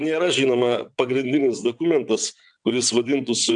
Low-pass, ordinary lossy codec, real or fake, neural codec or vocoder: 9.9 kHz; MP3, 96 kbps; fake; vocoder, 22.05 kHz, 80 mel bands, WaveNeXt